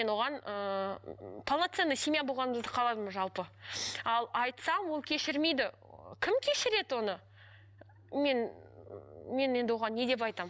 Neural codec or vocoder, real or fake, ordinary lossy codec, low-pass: none; real; none; none